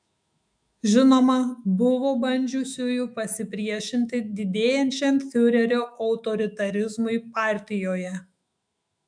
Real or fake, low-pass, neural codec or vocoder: fake; 9.9 kHz; autoencoder, 48 kHz, 128 numbers a frame, DAC-VAE, trained on Japanese speech